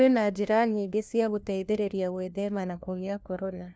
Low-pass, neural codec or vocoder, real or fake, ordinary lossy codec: none; codec, 16 kHz, 1 kbps, FunCodec, trained on LibriTTS, 50 frames a second; fake; none